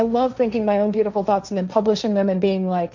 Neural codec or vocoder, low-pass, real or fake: codec, 16 kHz, 1.1 kbps, Voila-Tokenizer; 7.2 kHz; fake